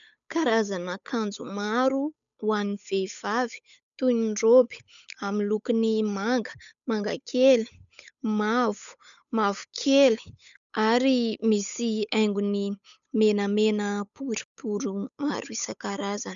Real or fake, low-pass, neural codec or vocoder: fake; 7.2 kHz; codec, 16 kHz, 8 kbps, FunCodec, trained on Chinese and English, 25 frames a second